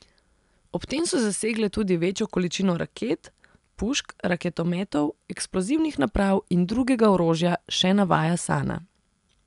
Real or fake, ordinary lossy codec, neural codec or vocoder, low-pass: real; none; none; 10.8 kHz